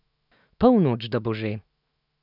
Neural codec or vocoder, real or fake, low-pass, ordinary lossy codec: autoencoder, 48 kHz, 128 numbers a frame, DAC-VAE, trained on Japanese speech; fake; 5.4 kHz; none